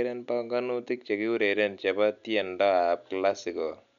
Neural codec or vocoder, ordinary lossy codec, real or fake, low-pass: none; none; real; 7.2 kHz